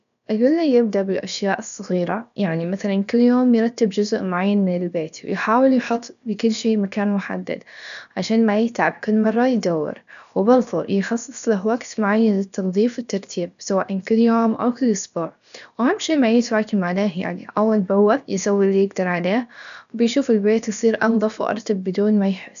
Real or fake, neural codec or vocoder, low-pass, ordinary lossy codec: fake; codec, 16 kHz, about 1 kbps, DyCAST, with the encoder's durations; 7.2 kHz; none